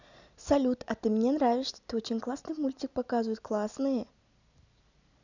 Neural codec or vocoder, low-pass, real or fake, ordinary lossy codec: none; 7.2 kHz; real; none